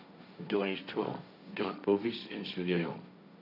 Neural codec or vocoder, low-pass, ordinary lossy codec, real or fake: codec, 16 kHz, 1.1 kbps, Voila-Tokenizer; 5.4 kHz; none; fake